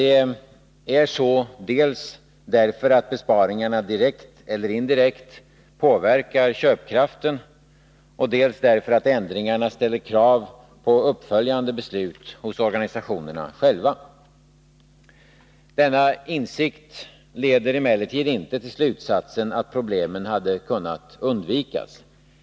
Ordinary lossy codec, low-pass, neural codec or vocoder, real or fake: none; none; none; real